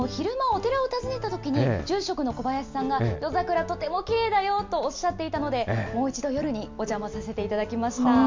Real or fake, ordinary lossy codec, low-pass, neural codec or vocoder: real; MP3, 64 kbps; 7.2 kHz; none